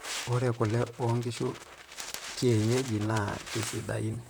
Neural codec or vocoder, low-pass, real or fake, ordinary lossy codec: vocoder, 44.1 kHz, 128 mel bands, Pupu-Vocoder; none; fake; none